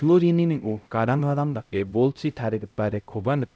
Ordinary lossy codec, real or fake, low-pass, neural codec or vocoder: none; fake; none; codec, 16 kHz, 0.5 kbps, X-Codec, HuBERT features, trained on LibriSpeech